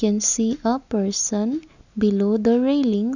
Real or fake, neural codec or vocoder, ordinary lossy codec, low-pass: real; none; none; 7.2 kHz